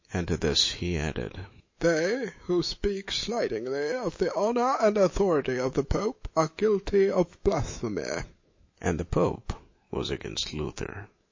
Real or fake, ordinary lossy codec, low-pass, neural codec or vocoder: fake; MP3, 32 kbps; 7.2 kHz; autoencoder, 48 kHz, 128 numbers a frame, DAC-VAE, trained on Japanese speech